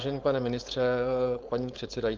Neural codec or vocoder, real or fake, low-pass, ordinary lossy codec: codec, 16 kHz, 4.8 kbps, FACodec; fake; 7.2 kHz; Opus, 24 kbps